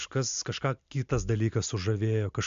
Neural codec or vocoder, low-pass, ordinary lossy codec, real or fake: none; 7.2 kHz; MP3, 64 kbps; real